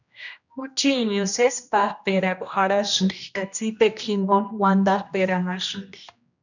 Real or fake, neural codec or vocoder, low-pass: fake; codec, 16 kHz, 1 kbps, X-Codec, HuBERT features, trained on general audio; 7.2 kHz